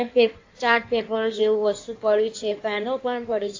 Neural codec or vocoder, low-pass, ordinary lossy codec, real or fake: codec, 16 kHz in and 24 kHz out, 1.1 kbps, FireRedTTS-2 codec; 7.2 kHz; AAC, 48 kbps; fake